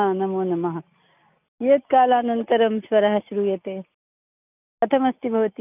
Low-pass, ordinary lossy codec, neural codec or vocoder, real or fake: 3.6 kHz; none; none; real